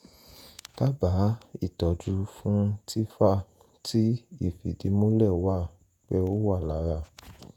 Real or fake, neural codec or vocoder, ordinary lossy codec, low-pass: fake; vocoder, 48 kHz, 128 mel bands, Vocos; none; 19.8 kHz